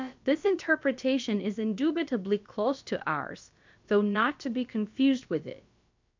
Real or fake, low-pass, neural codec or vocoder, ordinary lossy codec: fake; 7.2 kHz; codec, 16 kHz, about 1 kbps, DyCAST, with the encoder's durations; MP3, 64 kbps